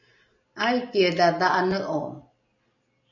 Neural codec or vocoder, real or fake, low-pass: none; real; 7.2 kHz